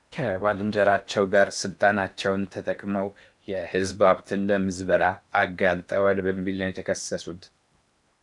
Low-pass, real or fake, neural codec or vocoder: 10.8 kHz; fake; codec, 16 kHz in and 24 kHz out, 0.8 kbps, FocalCodec, streaming, 65536 codes